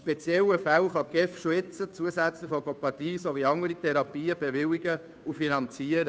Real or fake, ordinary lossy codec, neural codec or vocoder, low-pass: fake; none; codec, 16 kHz, 2 kbps, FunCodec, trained on Chinese and English, 25 frames a second; none